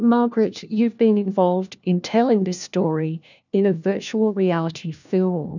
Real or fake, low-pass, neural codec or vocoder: fake; 7.2 kHz; codec, 16 kHz, 1 kbps, FunCodec, trained on LibriTTS, 50 frames a second